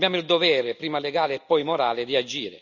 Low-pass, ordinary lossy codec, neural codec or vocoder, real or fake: 7.2 kHz; none; none; real